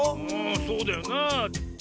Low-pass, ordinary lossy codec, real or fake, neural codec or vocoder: none; none; real; none